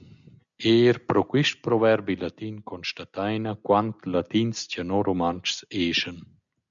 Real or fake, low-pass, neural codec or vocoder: real; 7.2 kHz; none